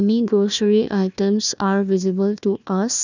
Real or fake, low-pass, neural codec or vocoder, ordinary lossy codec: fake; 7.2 kHz; codec, 16 kHz, 1 kbps, FunCodec, trained on Chinese and English, 50 frames a second; none